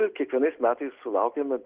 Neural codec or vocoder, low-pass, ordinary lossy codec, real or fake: none; 3.6 kHz; Opus, 16 kbps; real